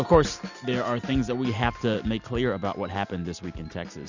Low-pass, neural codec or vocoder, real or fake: 7.2 kHz; none; real